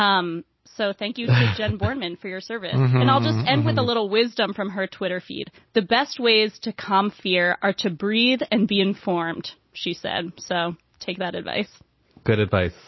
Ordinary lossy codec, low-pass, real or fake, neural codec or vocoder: MP3, 24 kbps; 7.2 kHz; real; none